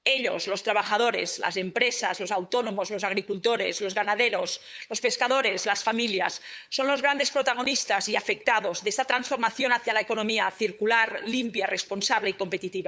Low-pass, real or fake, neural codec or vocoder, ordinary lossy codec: none; fake; codec, 16 kHz, 8 kbps, FunCodec, trained on LibriTTS, 25 frames a second; none